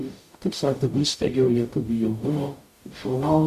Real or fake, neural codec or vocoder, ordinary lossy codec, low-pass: fake; codec, 44.1 kHz, 0.9 kbps, DAC; MP3, 96 kbps; 14.4 kHz